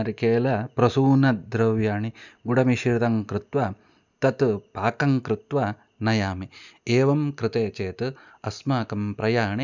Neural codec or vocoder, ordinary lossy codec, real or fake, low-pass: none; none; real; 7.2 kHz